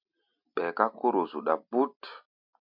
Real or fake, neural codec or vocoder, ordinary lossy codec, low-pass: real; none; MP3, 48 kbps; 5.4 kHz